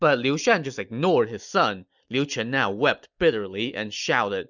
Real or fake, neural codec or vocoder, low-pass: real; none; 7.2 kHz